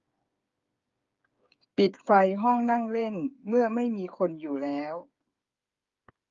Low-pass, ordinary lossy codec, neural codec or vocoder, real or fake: 7.2 kHz; Opus, 32 kbps; codec, 16 kHz, 8 kbps, FreqCodec, smaller model; fake